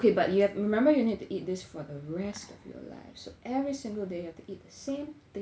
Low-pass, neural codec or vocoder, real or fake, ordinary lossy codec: none; none; real; none